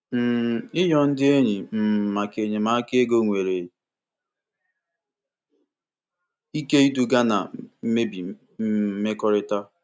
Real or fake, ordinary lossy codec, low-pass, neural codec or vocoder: real; none; none; none